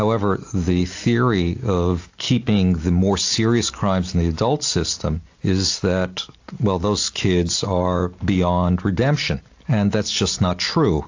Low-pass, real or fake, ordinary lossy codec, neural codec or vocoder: 7.2 kHz; real; AAC, 48 kbps; none